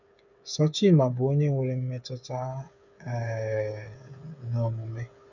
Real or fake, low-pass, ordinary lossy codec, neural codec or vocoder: fake; 7.2 kHz; none; codec, 16 kHz, 8 kbps, FreqCodec, smaller model